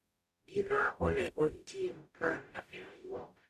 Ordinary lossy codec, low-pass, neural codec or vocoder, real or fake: none; 14.4 kHz; codec, 44.1 kHz, 0.9 kbps, DAC; fake